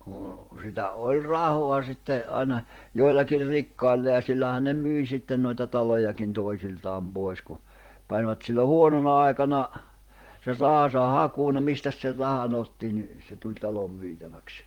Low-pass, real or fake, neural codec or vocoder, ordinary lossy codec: 19.8 kHz; fake; vocoder, 44.1 kHz, 128 mel bands, Pupu-Vocoder; Opus, 24 kbps